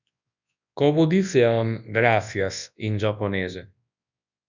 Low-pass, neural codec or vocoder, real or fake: 7.2 kHz; codec, 24 kHz, 0.9 kbps, WavTokenizer, large speech release; fake